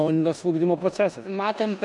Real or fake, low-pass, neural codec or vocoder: fake; 10.8 kHz; codec, 16 kHz in and 24 kHz out, 0.9 kbps, LongCat-Audio-Codec, four codebook decoder